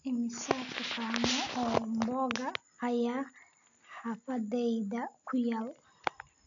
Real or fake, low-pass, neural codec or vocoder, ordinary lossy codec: real; 7.2 kHz; none; AAC, 64 kbps